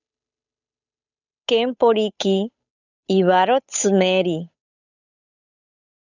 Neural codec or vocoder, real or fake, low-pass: codec, 16 kHz, 8 kbps, FunCodec, trained on Chinese and English, 25 frames a second; fake; 7.2 kHz